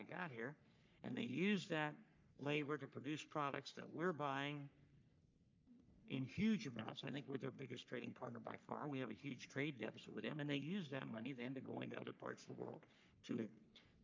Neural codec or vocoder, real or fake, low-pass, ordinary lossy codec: codec, 44.1 kHz, 3.4 kbps, Pupu-Codec; fake; 7.2 kHz; MP3, 64 kbps